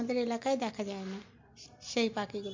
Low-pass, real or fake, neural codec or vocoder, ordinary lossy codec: 7.2 kHz; real; none; MP3, 48 kbps